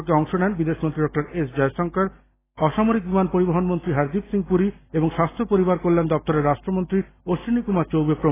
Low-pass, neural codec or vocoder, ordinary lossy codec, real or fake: 3.6 kHz; none; AAC, 16 kbps; real